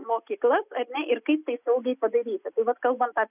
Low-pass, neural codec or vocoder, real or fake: 3.6 kHz; none; real